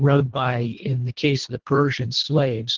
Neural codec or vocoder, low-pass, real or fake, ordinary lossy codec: codec, 24 kHz, 1.5 kbps, HILCodec; 7.2 kHz; fake; Opus, 16 kbps